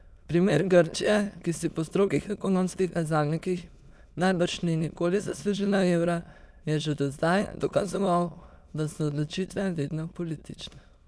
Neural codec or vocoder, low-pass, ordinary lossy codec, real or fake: autoencoder, 22.05 kHz, a latent of 192 numbers a frame, VITS, trained on many speakers; none; none; fake